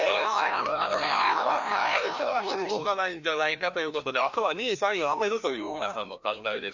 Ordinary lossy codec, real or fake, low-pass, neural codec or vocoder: none; fake; 7.2 kHz; codec, 16 kHz, 1 kbps, FreqCodec, larger model